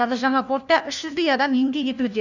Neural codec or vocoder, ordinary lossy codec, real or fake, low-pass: codec, 16 kHz, 1 kbps, FunCodec, trained on LibriTTS, 50 frames a second; none; fake; 7.2 kHz